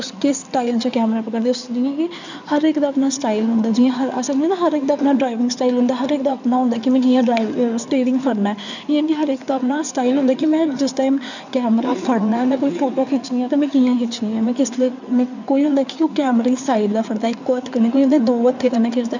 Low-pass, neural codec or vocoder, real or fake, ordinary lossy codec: 7.2 kHz; codec, 16 kHz in and 24 kHz out, 2.2 kbps, FireRedTTS-2 codec; fake; none